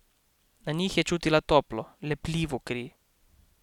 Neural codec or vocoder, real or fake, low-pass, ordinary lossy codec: none; real; 19.8 kHz; none